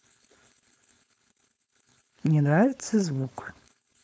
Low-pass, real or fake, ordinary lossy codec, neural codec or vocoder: none; fake; none; codec, 16 kHz, 4.8 kbps, FACodec